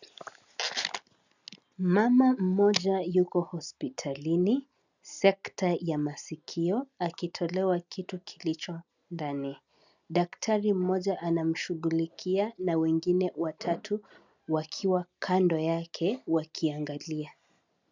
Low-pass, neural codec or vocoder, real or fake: 7.2 kHz; none; real